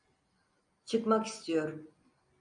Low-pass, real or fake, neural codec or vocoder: 9.9 kHz; real; none